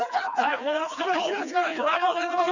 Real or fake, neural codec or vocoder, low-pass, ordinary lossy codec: fake; codec, 16 kHz, 2 kbps, FreqCodec, smaller model; 7.2 kHz; none